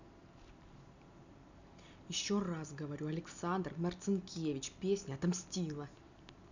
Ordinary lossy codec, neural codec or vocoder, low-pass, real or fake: none; none; 7.2 kHz; real